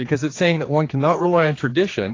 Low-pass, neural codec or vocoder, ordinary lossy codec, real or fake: 7.2 kHz; codec, 16 kHz, 2 kbps, X-Codec, HuBERT features, trained on general audio; AAC, 32 kbps; fake